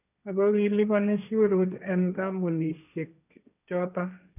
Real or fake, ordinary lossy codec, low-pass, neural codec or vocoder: fake; none; 3.6 kHz; codec, 16 kHz, 1.1 kbps, Voila-Tokenizer